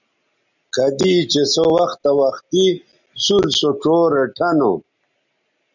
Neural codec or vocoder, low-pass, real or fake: none; 7.2 kHz; real